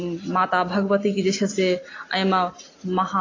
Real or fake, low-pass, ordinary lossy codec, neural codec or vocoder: real; 7.2 kHz; AAC, 32 kbps; none